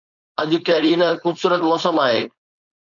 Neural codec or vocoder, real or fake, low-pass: codec, 16 kHz, 4.8 kbps, FACodec; fake; 7.2 kHz